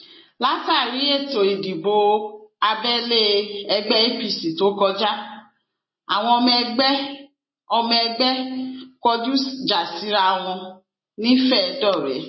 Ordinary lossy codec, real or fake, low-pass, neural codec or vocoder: MP3, 24 kbps; real; 7.2 kHz; none